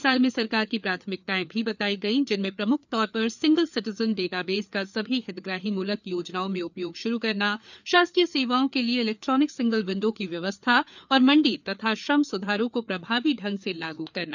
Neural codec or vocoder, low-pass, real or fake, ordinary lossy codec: codec, 16 kHz, 4 kbps, FreqCodec, larger model; 7.2 kHz; fake; none